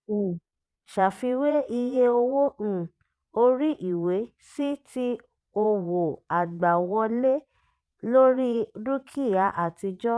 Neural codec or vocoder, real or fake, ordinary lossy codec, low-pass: vocoder, 22.05 kHz, 80 mel bands, Vocos; fake; none; none